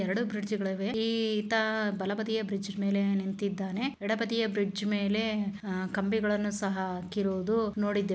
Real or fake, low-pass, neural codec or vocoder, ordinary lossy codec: real; none; none; none